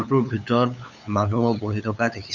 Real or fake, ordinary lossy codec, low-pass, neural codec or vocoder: fake; Opus, 64 kbps; 7.2 kHz; codec, 16 kHz, 8 kbps, FunCodec, trained on LibriTTS, 25 frames a second